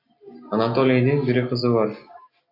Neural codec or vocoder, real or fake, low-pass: none; real; 5.4 kHz